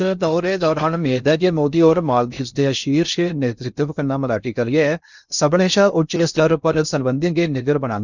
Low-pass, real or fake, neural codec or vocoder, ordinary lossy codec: 7.2 kHz; fake; codec, 16 kHz in and 24 kHz out, 0.6 kbps, FocalCodec, streaming, 2048 codes; none